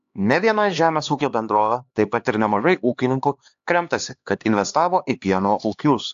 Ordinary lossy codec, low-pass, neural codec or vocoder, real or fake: AAC, 64 kbps; 7.2 kHz; codec, 16 kHz, 2 kbps, X-Codec, HuBERT features, trained on LibriSpeech; fake